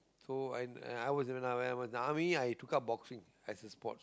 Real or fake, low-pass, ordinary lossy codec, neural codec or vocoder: real; none; none; none